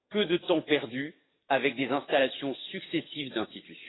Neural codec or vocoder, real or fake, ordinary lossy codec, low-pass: codec, 44.1 kHz, 7.8 kbps, DAC; fake; AAC, 16 kbps; 7.2 kHz